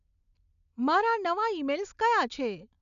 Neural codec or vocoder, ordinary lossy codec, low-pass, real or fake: none; none; 7.2 kHz; real